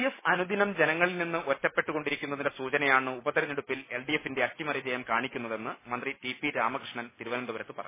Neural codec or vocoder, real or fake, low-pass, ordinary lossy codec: none; real; 3.6 kHz; MP3, 16 kbps